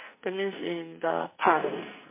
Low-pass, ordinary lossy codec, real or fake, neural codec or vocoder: 3.6 kHz; MP3, 24 kbps; fake; codec, 44.1 kHz, 2.6 kbps, SNAC